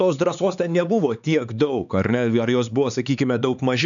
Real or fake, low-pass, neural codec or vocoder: fake; 7.2 kHz; codec, 16 kHz, 4 kbps, X-Codec, HuBERT features, trained on LibriSpeech